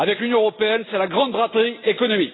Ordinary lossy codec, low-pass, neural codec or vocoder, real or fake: AAC, 16 kbps; 7.2 kHz; none; real